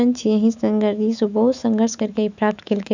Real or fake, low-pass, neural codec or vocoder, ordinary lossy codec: fake; 7.2 kHz; autoencoder, 48 kHz, 128 numbers a frame, DAC-VAE, trained on Japanese speech; none